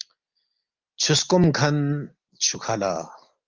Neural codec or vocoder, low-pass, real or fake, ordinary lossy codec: none; 7.2 kHz; real; Opus, 24 kbps